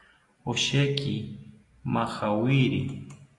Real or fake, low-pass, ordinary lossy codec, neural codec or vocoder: real; 10.8 kHz; AAC, 48 kbps; none